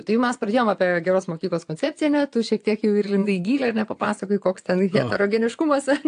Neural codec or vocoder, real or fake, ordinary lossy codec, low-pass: vocoder, 22.05 kHz, 80 mel bands, Vocos; fake; AAC, 64 kbps; 9.9 kHz